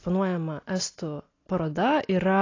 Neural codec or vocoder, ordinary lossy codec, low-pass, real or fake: none; AAC, 32 kbps; 7.2 kHz; real